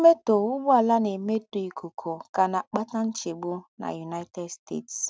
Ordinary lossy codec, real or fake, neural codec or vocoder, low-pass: none; real; none; none